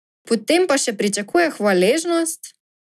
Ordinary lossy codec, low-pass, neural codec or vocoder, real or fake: none; none; none; real